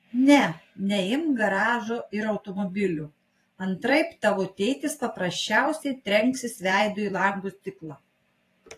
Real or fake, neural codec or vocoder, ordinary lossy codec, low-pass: fake; vocoder, 44.1 kHz, 128 mel bands every 512 samples, BigVGAN v2; AAC, 48 kbps; 14.4 kHz